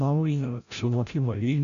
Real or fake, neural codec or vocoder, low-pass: fake; codec, 16 kHz, 0.5 kbps, FreqCodec, larger model; 7.2 kHz